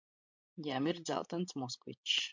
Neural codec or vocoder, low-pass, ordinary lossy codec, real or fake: codec, 16 kHz, 16 kbps, FreqCodec, larger model; 7.2 kHz; MP3, 64 kbps; fake